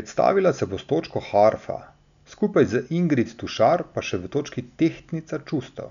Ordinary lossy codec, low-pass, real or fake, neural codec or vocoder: none; 7.2 kHz; real; none